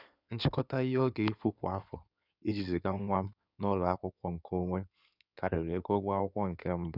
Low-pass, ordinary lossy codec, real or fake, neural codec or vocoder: 5.4 kHz; none; fake; codec, 16 kHz in and 24 kHz out, 2.2 kbps, FireRedTTS-2 codec